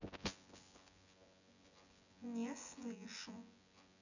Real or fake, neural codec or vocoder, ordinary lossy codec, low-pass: fake; vocoder, 24 kHz, 100 mel bands, Vocos; none; 7.2 kHz